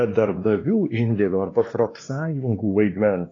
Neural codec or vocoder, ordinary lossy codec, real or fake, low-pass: codec, 16 kHz, 2 kbps, X-Codec, WavLM features, trained on Multilingual LibriSpeech; AAC, 32 kbps; fake; 7.2 kHz